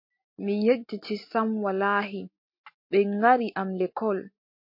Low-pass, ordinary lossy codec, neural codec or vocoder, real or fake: 5.4 kHz; MP3, 32 kbps; none; real